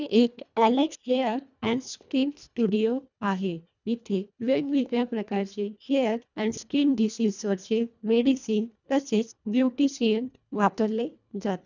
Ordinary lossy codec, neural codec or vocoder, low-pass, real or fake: none; codec, 24 kHz, 1.5 kbps, HILCodec; 7.2 kHz; fake